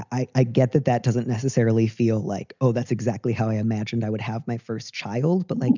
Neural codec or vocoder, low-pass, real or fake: none; 7.2 kHz; real